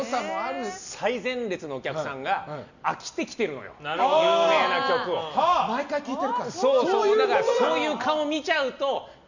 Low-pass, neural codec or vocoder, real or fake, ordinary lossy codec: 7.2 kHz; none; real; none